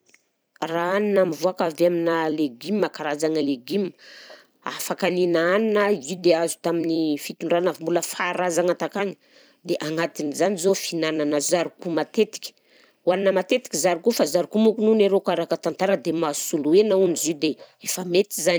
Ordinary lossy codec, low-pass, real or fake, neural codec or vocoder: none; none; fake; vocoder, 44.1 kHz, 128 mel bands every 256 samples, BigVGAN v2